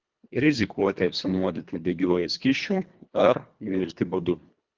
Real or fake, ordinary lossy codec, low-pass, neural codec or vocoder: fake; Opus, 24 kbps; 7.2 kHz; codec, 24 kHz, 1.5 kbps, HILCodec